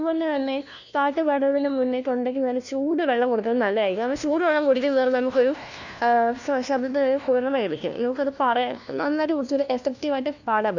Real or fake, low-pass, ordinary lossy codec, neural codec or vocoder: fake; 7.2 kHz; none; codec, 16 kHz, 1 kbps, FunCodec, trained on LibriTTS, 50 frames a second